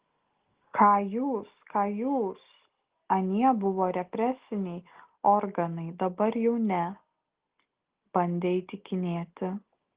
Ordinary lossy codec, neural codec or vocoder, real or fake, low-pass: Opus, 16 kbps; none; real; 3.6 kHz